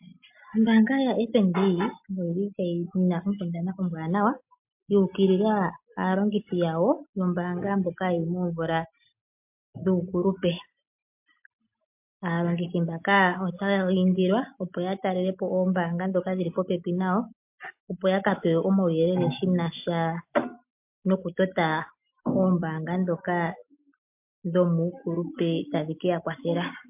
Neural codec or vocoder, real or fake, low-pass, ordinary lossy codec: none; real; 3.6 kHz; MP3, 32 kbps